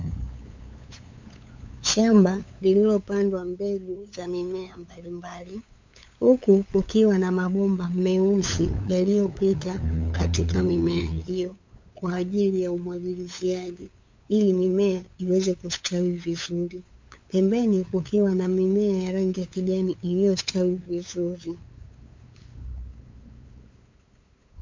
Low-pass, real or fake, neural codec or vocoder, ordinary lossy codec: 7.2 kHz; fake; codec, 16 kHz, 8 kbps, FunCodec, trained on LibriTTS, 25 frames a second; MP3, 48 kbps